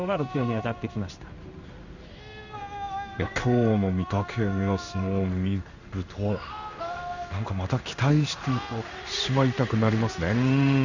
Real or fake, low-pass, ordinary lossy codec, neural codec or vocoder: fake; 7.2 kHz; none; codec, 16 kHz in and 24 kHz out, 1 kbps, XY-Tokenizer